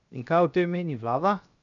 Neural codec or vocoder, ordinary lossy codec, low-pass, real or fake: codec, 16 kHz, 0.7 kbps, FocalCodec; none; 7.2 kHz; fake